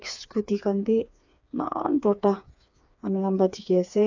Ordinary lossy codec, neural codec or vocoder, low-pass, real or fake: AAC, 48 kbps; codec, 16 kHz, 4 kbps, FreqCodec, smaller model; 7.2 kHz; fake